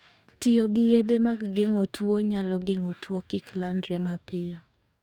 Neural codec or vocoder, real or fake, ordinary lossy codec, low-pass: codec, 44.1 kHz, 2.6 kbps, DAC; fake; none; 19.8 kHz